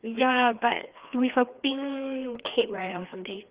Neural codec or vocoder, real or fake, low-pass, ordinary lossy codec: codec, 16 kHz, 2 kbps, FreqCodec, larger model; fake; 3.6 kHz; Opus, 24 kbps